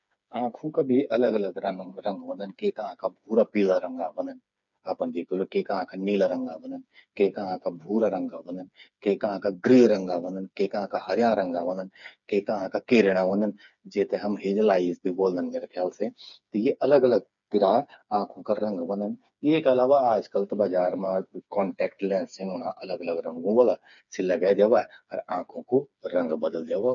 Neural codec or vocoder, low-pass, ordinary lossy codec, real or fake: codec, 16 kHz, 4 kbps, FreqCodec, smaller model; 7.2 kHz; none; fake